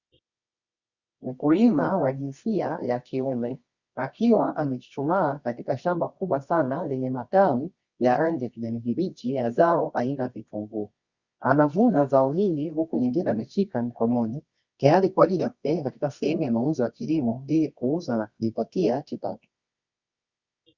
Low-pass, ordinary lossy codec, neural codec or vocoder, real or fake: 7.2 kHz; Opus, 64 kbps; codec, 24 kHz, 0.9 kbps, WavTokenizer, medium music audio release; fake